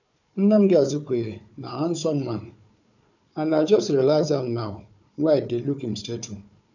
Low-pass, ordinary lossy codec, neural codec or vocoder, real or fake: 7.2 kHz; none; codec, 16 kHz, 4 kbps, FunCodec, trained on Chinese and English, 50 frames a second; fake